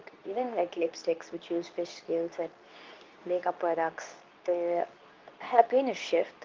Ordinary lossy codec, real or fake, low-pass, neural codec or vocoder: Opus, 24 kbps; fake; 7.2 kHz; codec, 24 kHz, 0.9 kbps, WavTokenizer, medium speech release version 2